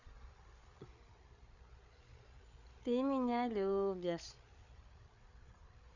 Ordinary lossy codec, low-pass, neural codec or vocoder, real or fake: none; 7.2 kHz; codec, 16 kHz, 8 kbps, FreqCodec, larger model; fake